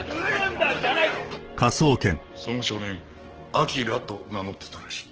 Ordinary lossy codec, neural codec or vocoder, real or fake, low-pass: Opus, 16 kbps; codec, 44.1 kHz, 7.8 kbps, DAC; fake; 7.2 kHz